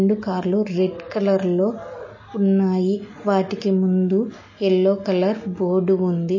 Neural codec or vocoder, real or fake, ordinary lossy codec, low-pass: none; real; MP3, 32 kbps; 7.2 kHz